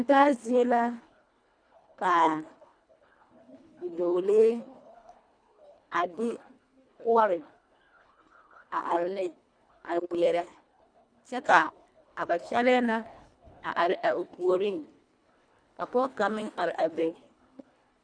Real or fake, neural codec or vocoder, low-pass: fake; codec, 24 kHz, 1.5 kbps, HILCodec; 9.9 kHz